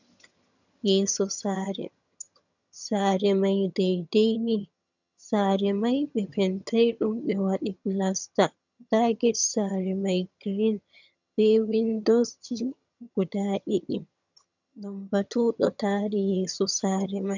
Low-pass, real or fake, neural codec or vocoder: 7.2 kHz; fake; vocoder, 22.05 kHz, 80 mel bands, HiFi-GAN